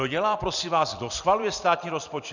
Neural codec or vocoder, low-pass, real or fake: vocoder, 44.1 kHz, 128 mel bands every 256 samples, BigVGAN v2; 7.2 kHz; fake